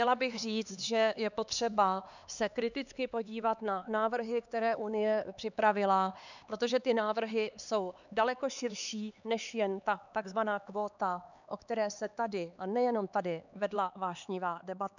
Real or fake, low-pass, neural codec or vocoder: fake; 7.2 kHz; codec, 16 kHz, 4 kbps, X-Codec, HuBERT features, trained on LibriSpeech